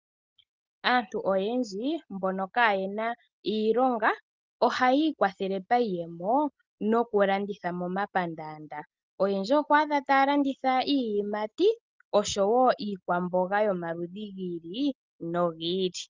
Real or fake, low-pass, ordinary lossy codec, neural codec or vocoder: real; 7.2 kHz; Opus, 24 kbps; none